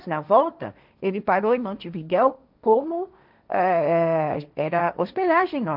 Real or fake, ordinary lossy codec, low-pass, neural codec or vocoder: fake; none; 5.4 kHz; codec, 16 kHz, 1.1 kbps, Voila-Tokenizer